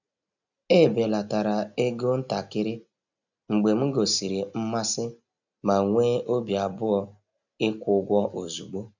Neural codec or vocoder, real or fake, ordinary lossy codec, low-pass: none; real; none; 7.2 kHz